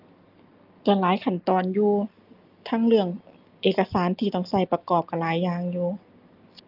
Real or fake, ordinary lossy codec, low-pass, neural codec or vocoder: real; Opus, 32 kbps; 5.4 kHz; none